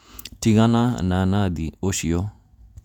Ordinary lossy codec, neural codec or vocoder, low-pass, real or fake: none; vocoder, 48 kHz, 128 mel bands, Vocos; 19.8 kHz; fake